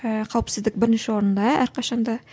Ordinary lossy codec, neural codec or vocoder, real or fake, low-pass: none; none; real; none